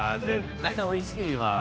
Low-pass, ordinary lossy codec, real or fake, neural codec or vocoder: none; none; fake; codec, 16 kHz, 1 kbps, X-Codec, HuBERT features, trained on general audio